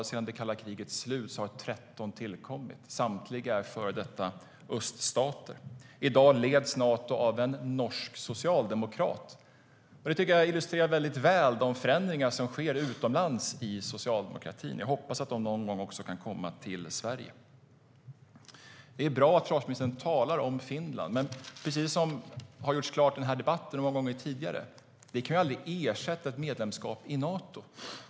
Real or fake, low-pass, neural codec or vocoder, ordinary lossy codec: real; none; none; none